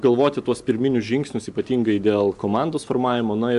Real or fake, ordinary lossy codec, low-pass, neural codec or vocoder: real; MP3, 96 kbps; 10.8 kHz; none